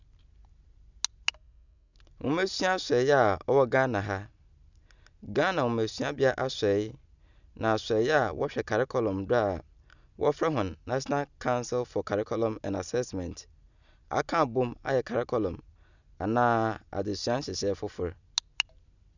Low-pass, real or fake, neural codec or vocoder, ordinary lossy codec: 7.2 kHz; real; none; none